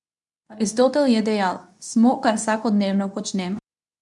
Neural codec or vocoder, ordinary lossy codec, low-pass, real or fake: codec, 24 kHz, 0.9 kbps, WavTokenizer, medium speech release version 2; none; 10.8 kHz; fake